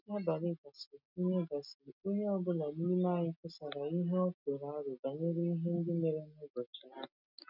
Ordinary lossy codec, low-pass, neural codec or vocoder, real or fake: none; 5.4 kHz; none; real